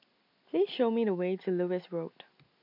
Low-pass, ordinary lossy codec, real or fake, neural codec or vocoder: 5.4 kHz; MP3, 48 kbps; real; none